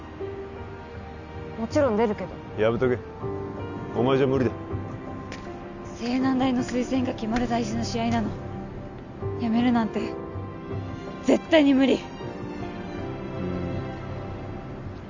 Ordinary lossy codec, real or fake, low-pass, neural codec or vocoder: none; real; 7.2 kHz; none